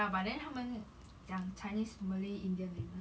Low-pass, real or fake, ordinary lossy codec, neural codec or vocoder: none; real; none; none